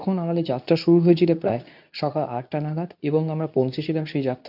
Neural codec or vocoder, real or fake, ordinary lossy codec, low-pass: codec, 24 kHz, 0.9 kbps, WavTokenizer, medium speech release version 2; fake; none; 5.4 kHz